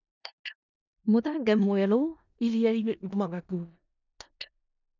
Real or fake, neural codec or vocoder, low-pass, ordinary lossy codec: fake; codec, 16 kHz in and 24 kHz out, 0.4 kbps, LongCat-Audio-Codec, four codebook decoder; 7.2 kHz; none